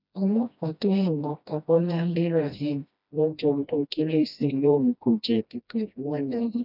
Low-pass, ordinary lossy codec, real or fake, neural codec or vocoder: 5.4 kHz; none; fake; codec, 16 kHz, 1 kbps, FreqCodec, smaller model